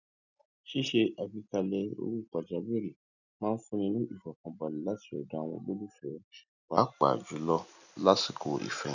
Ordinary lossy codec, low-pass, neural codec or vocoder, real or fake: none; 7.2 kHz; none; real